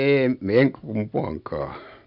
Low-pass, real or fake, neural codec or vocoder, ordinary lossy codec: 5.4 kHz; real; none; none